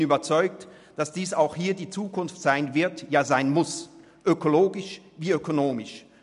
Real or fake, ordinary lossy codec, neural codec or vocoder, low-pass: real; none; none; 10.8 kHz